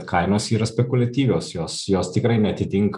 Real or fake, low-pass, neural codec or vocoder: real; 10.8 kHz; none